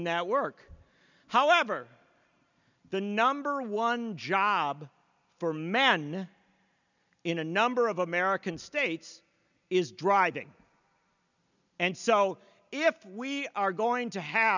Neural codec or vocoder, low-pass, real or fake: none; 7.2 kHz; real